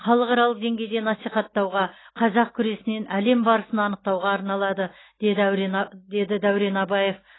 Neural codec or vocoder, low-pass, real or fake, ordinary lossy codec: none; 7.2 kHz; real; AAC, 16 kbps